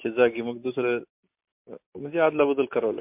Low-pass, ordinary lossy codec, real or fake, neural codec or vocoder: 3.6 kHz; MP3, 32 kbps; real; none